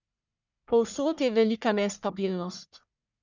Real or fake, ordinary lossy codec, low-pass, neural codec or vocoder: fake; none; 7.2 kHz; codec, 44.1 kHz, 1.7 kbps, Pupu-Codec